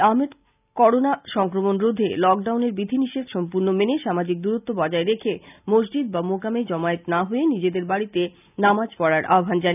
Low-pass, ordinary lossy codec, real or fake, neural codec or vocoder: 3.6 kHz; none; real; none